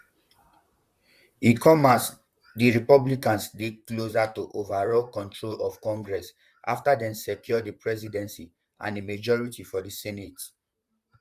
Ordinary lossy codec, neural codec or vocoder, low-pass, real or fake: Opus, 64 kbps; vocoder, 44.1 kHz, 128 mel bands, Pupu-Vocoder; 14.4 kHz; fake